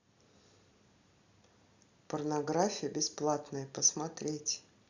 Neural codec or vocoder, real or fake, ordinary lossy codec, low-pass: none; real; Opus, 64 kbps; 7.2 kHz